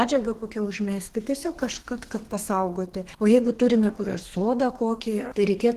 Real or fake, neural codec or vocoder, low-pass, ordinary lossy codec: fake; codec, 32 kHz, 1.9 kbps, SNAC; 14.4 kHz; Opus, 16 kbps